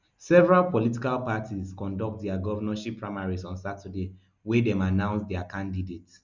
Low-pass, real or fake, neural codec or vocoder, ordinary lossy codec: 7.2 kHz; real; none; Opus, 64 kbps